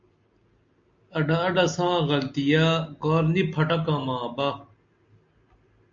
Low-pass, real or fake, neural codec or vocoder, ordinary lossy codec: 7.2 kHz; real; none; MP3, 64 kbps